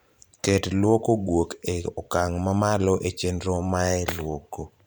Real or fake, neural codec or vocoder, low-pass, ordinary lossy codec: fake; vocoder, 44.1 kHz, 128 mel bands every 512 samples, BigVGAN v2; none; none